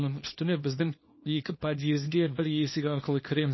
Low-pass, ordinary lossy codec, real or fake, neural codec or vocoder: 7.2 kHz; MP3, 24 kbps; fake; codec, 24 kHz, 0.9 kbps, WavTokenizer, small release